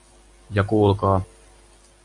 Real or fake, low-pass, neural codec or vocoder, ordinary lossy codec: real; 10.8 kHz; none; AAC, 64 kbps